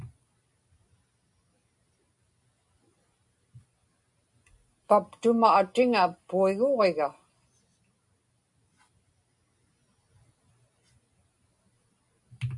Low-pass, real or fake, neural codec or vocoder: 10.8 kHz; real; none